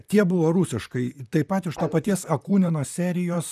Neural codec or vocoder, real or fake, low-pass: vocoder, 44.1 kHz, 128 mel bands, Pupu-Vocoder; fake; 14.4 kHz